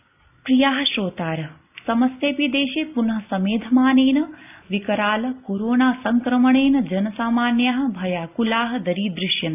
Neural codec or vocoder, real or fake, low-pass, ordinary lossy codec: none; real; 3.6 kHz; AAC, 32 kbps